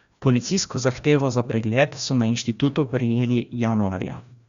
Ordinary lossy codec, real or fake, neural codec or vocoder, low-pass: Opus, 64 kbps; fake; codec, 16 kHz, 1 kbps, FreqCodec, larger model; 7.2 kHz